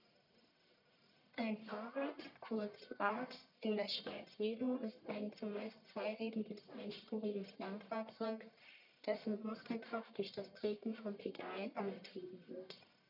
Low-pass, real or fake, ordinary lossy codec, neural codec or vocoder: 5.4 kHz; fake; none; codec, 44.1 kHz, 1.7 kbps, Pupu-Codec